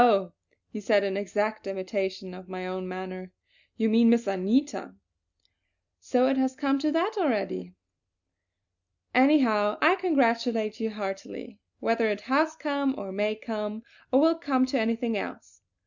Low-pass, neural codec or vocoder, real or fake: 7.2 kHz; none; real